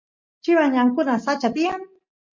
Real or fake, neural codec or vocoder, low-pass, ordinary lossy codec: real; none; 7.2 kHz; MP3, 48 kbps